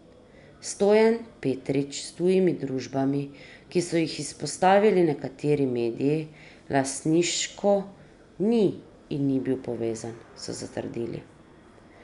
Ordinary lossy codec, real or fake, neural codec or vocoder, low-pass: none; real; none; 10.8 kHz